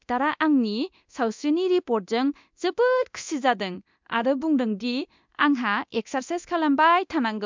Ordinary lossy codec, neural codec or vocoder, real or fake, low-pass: MP3, 64 kbps; codec, 24 kHz, 0.9 kbps, DualCodec; fake; 7.2 kHz